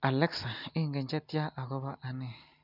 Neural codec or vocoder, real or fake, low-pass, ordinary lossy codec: none; real; 5.4 kHz; none